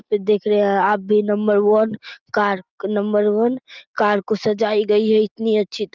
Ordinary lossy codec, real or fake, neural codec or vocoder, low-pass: Opus, 24 kbps; real; none; 7.2 kHz